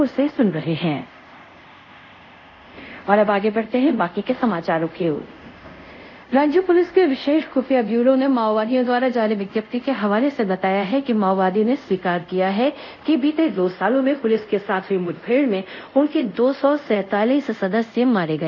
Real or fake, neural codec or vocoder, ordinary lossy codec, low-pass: fake; codec, 24 kHz, 0.5 kbps, DualCodec; none; 7.2 kHz